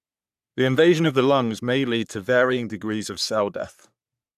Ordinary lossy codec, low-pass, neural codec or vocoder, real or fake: none; 14.4 kHz; codec, 44.1 kHz, 3.4 kbps, Pupu-Codec; fake